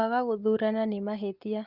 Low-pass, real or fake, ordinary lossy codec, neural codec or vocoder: 5.4 kHz; real; Opus, 24 kbps; none